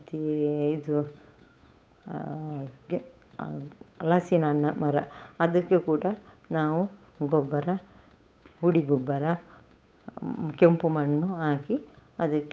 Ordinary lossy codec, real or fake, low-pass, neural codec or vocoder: none; fake; none; codec, 16 kHz, 8 kbps, FunCodec, trained on Chinese and English, 25 frames a second